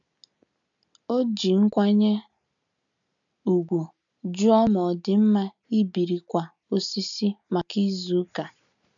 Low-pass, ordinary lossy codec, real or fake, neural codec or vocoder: 7.2 kHz; none; real; none